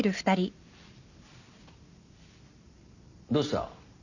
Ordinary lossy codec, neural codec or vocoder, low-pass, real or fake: none; none; 7.2 kHz; real